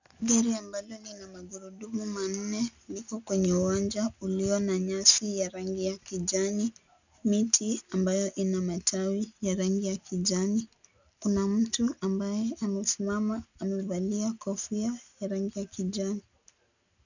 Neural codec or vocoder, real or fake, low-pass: none; real; 7.2 kHz